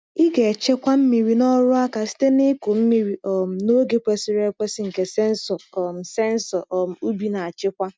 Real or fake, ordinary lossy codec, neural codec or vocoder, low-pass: real; none; none; none